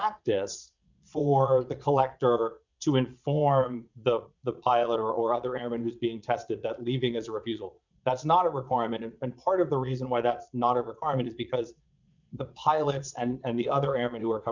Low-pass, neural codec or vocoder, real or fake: 7.2 kHz; vocoder, 22.05 kHz, 80 mel bands, Vocos; fake